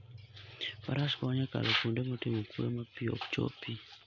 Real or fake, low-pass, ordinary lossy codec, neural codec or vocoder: real; 7.2 kHz; none; none